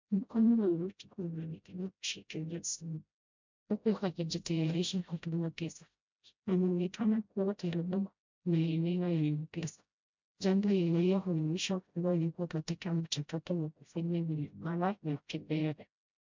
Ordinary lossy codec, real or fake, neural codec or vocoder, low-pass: MP3, 64 kbps; fake; codec, 16 kHz, 0.5 kbps, FreqCodec, smaller model; 7.2 kHz